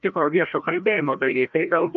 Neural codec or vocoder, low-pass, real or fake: codec, 16 kHz, 1 kbps, FreqCodec, larger model; 7.2 kHz; fake